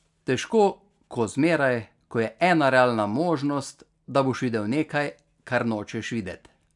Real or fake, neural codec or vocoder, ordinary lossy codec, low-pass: real; none; none; 10.8 kHz